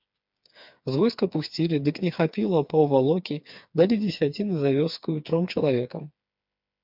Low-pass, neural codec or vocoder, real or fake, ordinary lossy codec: 5.4 kHz; codec, 16 kHz, 4 kbps, FreqCodec, smaller model; fake; MP3, 48 kbps